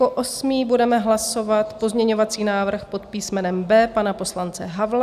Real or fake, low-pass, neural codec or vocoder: real; 14.4 kHz; none